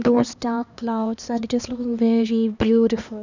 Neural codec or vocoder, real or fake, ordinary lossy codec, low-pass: codec, 16 kHz, 2 kbps, X-Codec, HuBERT features, trained on LibriSpeech; fake; none; 7.2 kHz